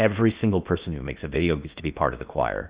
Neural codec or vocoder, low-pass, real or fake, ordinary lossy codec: codec, 16 kHz in and 24 kHz out, 0.8 kbps, FocalCodec, streaming, 65536 codes; 3.6 kHz; fake; Opus, 64 kbps